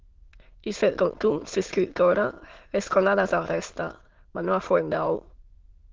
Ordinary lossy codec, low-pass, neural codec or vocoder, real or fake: Opus, 16 kbps; 7.2 kHz; autoencoder, 22.05 kHz, a latent of 192 numbers a frame, VITS, trained on many speakers; fake